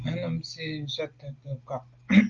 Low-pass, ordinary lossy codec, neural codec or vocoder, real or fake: 7.2 kHz; Opus, 24 kbps; none; real